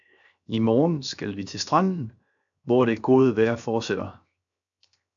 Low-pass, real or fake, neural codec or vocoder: 7.2 kHz; fake; codec, 16 kHz, 0.7 kbps, FocalCodec